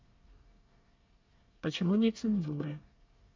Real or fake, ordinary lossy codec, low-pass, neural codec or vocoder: fake; MP3, 64 kbps; 7.2 kHz; codec, 24 kHz, 1 kbps, SNAC